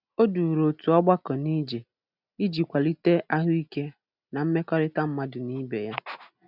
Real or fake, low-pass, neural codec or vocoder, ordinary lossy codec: real; 5.4 kHz; none; none